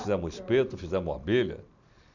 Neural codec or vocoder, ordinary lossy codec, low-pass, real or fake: none; none; 7.2 kHz; real